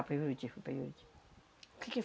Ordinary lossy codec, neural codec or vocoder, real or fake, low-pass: none; none; real; none